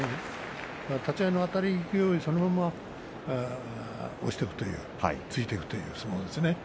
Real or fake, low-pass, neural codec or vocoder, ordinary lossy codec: real; none; none; none